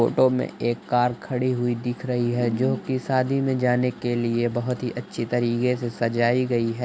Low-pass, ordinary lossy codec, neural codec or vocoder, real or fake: none; none; none; real